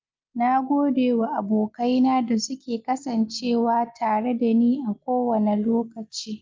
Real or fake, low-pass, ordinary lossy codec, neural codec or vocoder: real; 7.2 kHz; Opus, 32 kbps; none